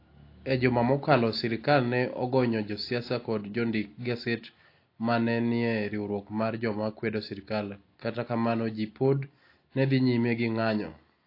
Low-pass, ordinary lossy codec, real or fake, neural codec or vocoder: 5.4 kHz; AAC, 32 kbps; real; none